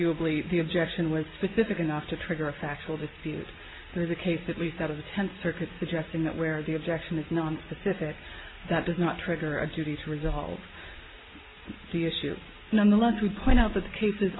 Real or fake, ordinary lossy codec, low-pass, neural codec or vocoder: real; AAC, 16 kbps; 7.2 kHz; none